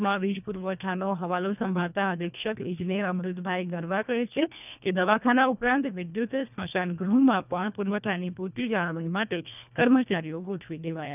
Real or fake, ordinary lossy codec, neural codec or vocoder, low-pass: fake; none; codec, 24 kHz, 1.5 kbps, HILCodec; 3.6 kHz